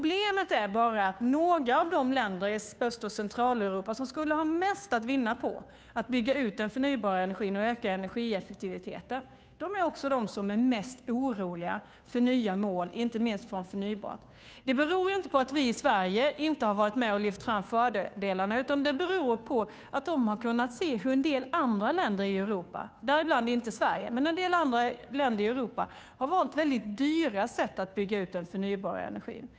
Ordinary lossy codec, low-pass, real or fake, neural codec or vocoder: none; none; fake; codec, 16 kHz, 2 kbps, FunCodec, trained on Chinese and English, 25 frames a second